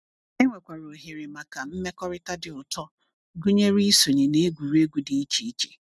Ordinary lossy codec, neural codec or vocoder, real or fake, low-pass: none; none; real; none